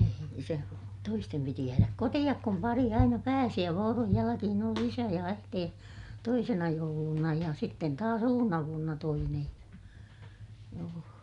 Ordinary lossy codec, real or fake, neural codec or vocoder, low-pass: none; real; none; 10.8 kHz